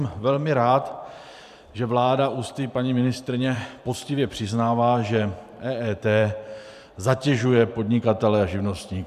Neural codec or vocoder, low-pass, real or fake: vocoder, 44.1 kHz, 128 mel bands every 512 samples, BigVGAN v2; 14.4 kHz; fake